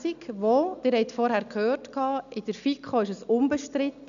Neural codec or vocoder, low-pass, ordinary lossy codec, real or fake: none; 7.2 kHz; none; real